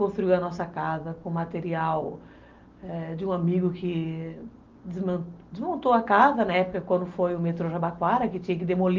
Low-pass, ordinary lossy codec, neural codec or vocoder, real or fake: 7.2 kHz; Opus, 32 kbps; none; real